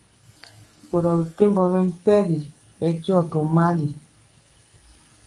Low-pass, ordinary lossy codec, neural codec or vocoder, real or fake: 10.8 kHz; Opus, 32 kbps; codec, 44.1 kHz, 2.6 kbps, SNAC; fake